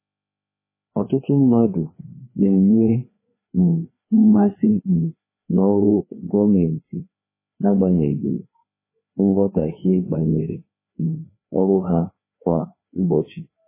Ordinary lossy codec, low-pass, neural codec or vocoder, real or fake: MP3, 16 kbps; 3.6 kHz; codec, 16 kHz, 2 kbps, FreqCodec, larger model; fake